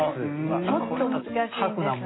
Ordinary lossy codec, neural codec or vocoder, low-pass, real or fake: AAC, 16 kbps; none; 7.2 kHz; real